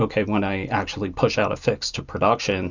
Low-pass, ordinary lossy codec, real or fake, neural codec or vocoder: 7.2 kHz; Opus, 64 kbps; real; none